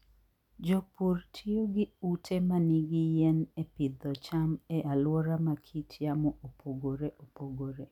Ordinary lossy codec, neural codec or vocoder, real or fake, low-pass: none; none; real; 19.8 kHz